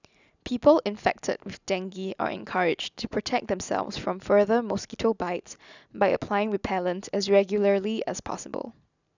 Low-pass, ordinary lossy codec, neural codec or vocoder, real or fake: 7.2 kHz; none; none; real